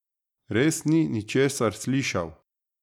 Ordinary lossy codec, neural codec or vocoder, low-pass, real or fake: none; none; 19.8 kHz; real